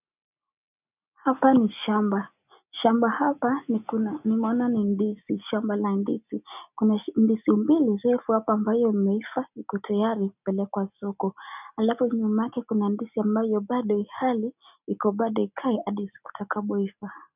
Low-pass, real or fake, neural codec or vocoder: 3.6 kHz; real; none